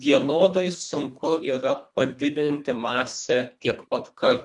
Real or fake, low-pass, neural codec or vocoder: fake; 10.8 kHz; codec, 24 kHz, 1.5 kbps, HILCodec